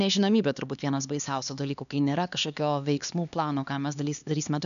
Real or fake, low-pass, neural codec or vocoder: fake; 7.2 kHz; codec, 16 kHz, 2 kbps, X-Codec, HuBERT features, trained on LibriSpeech